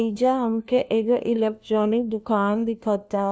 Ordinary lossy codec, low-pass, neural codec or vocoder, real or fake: none; none; codec, 16 kHz, 1 kbps, FunCodec, trained on LibriTTS, 50 frames a second; fake